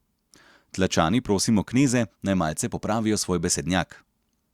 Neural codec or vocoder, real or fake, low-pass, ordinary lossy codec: none; real; 19.8 kHz; Opus, 64 kbps